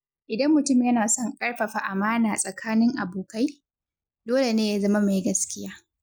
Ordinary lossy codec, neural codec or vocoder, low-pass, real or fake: none; none; none; real